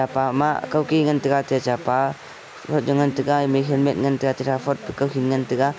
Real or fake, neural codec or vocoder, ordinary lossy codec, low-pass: real; none; none; none